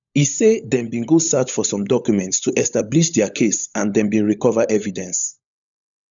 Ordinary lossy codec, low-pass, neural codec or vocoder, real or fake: none; 7.2 kHz; codec, 16 kHz, 16 kbps, FunCodec, trained on LibriTTS, 50 frames a second; fake